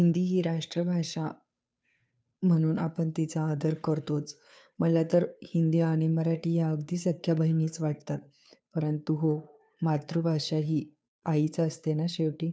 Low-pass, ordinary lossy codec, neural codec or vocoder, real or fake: none; none; codec, 16 kHz, 2 kbps, FunCodec, trained on Chinese and English, 25 frames a second; fake